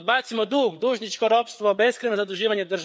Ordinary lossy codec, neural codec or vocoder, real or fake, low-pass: none; codec, 16 kHz, 4 kbps, FreqCodec, larger model; fake; none